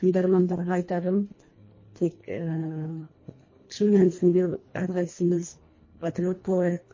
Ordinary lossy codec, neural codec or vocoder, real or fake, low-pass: MP3, 32 kbps; codec, 24 kHz, 1.5 kbps, HILCodec; fake; 7.2 kHz